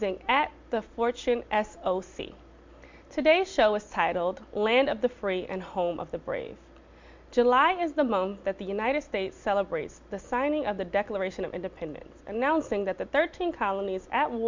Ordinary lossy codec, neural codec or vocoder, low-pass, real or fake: MP3, 64 kbps; none; 7.2 kHz; real